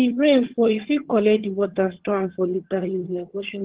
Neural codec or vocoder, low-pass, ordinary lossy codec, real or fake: vocoder, 22.05 kHz, 80 mel bands, HiFi-GAN; 3.6 kHz; Opus, 16 kbps; fake